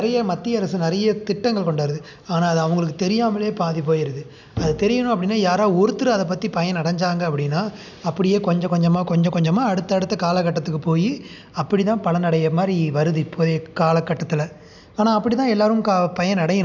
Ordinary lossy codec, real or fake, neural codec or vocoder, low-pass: none; real; none; 7.2 kHz